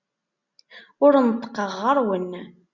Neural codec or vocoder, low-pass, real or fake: none; 7.2 kHz; real